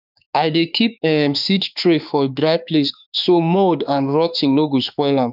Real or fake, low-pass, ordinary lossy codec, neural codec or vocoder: fake; 5.4 kHz; none; autoencoder, 48 kHz, 32 numbers a frame, DAC-VAE, trained on Japanese speech